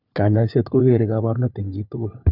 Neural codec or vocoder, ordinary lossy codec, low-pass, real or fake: codec, 16 kHz, 4 kbps, FunCodec, trained on LibriTTS, 50 frames a second; none; 5.4 kHz; fake